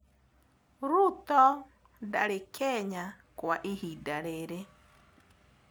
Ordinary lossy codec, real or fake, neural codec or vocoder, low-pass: none; real; none; none